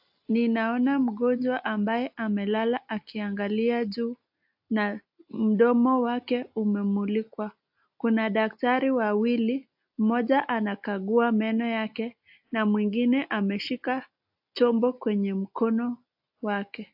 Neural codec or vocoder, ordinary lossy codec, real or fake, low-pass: none; AAC, 48 kbps; real; 5.4 kHz